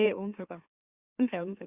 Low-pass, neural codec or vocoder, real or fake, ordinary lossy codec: 3.6 kHz; autoencoder, 44.1 kHz, a latent of 192 numbers a frame, MeloTTS; fake; Opus, 64 kbps